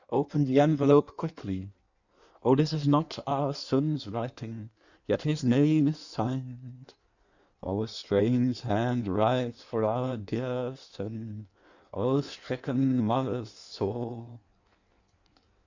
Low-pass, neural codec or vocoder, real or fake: 7.2 kHz; codec, 16 kHz in and 24 kHz out, 1.1 kbps, FireRedTTS-2 codec; fake